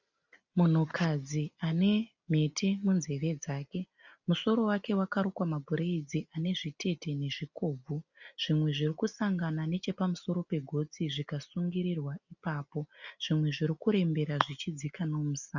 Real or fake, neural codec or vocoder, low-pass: real; none; 7.2 kHz